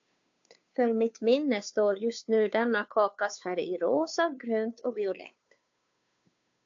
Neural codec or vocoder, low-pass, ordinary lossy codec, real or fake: codec, 16 kHz, 2 kbps, FunCodec, trained on Chinese and English, 25 frames a second; 7.2 kHz; AAC, 64 kbps; fake